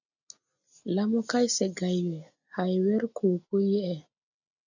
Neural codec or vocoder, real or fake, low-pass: none; real; 7.2 kHz